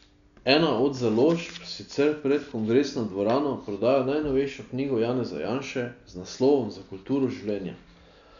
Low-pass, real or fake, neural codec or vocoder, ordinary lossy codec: 7.2 kHz; real; none; none